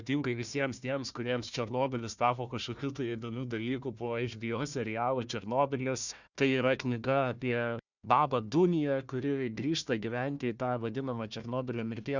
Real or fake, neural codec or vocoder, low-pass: fake; codec, 16 kHz, 1 kbps, FunCodec, trained on Chinese and English, 50 frames a second; 7.2 kHz